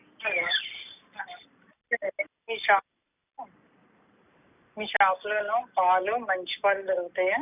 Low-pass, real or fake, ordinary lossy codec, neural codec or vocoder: 3.6 kHz; real; none; none